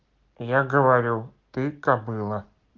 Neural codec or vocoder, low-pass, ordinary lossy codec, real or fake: none; 7.2 kHz; Opus, 16 kbps; real